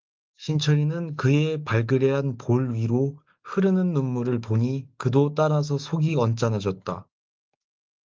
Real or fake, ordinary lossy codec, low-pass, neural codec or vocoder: fake; Opus, 32 kbps; 7.2 kHz; autoencoder, 48 kHz, 128 numbers a frame, DAC-VAE, trained on Japanese speech